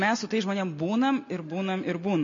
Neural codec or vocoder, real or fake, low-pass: none; real; 7.2 kHz